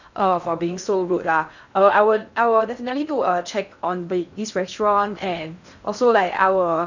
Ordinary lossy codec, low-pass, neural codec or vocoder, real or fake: none; 7.2 kHz; codec, 16 kHz in and 24 kHz out, 0.6 kbps, FocalCodec, streaming, 2048 codes; fake